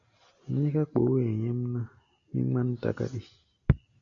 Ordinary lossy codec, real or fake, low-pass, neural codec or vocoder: MP3, 96 kbps; real; 7.2 kHz; none